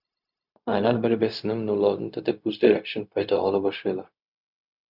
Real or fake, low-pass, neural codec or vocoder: fake; 5.4 kHz; codec, 16 kHz, 0.4 kbps, LongCat-Audio-Codec